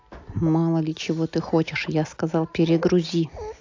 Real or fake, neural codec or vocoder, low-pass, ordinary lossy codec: real; none; 7.2 kHz; AAC, 48 kbps